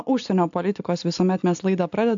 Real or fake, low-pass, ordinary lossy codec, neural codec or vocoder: real; 7.2 kHz; AAC, 64 kbps; none